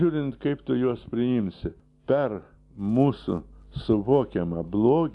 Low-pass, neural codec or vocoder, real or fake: 10.8 kHz; none; real